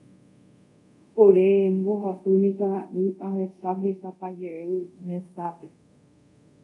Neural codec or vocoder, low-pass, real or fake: codec, 24 kHz, 0.5 kbps, DualCodec; 10.8 kHz; fake